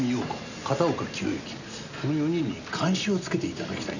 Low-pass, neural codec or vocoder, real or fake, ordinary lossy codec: 7.2 kHz; none; real; none